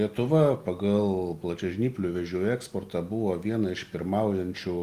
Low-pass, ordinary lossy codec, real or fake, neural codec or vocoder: 14.4 kHz; Opus, 32 kbps; real; none